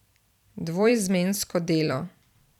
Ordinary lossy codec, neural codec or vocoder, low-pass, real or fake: none; vocoder, 44.1 kHz, 128 mel bands every 256 samples, BigVGAN v2; 19.8 kHz; fake